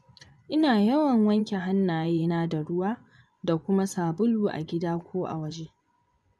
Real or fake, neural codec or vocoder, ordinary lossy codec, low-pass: fake; vocoder, 24 kHz, 100 mel bands, Vocos; none; none